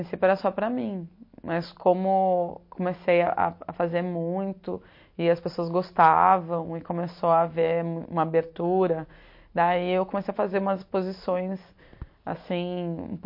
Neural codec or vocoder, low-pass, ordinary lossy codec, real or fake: none; 5.4 kHz; MP3, 32 kbps; real